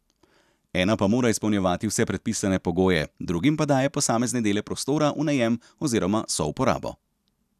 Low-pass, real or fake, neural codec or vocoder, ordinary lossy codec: 14.4 kHz; real; none; none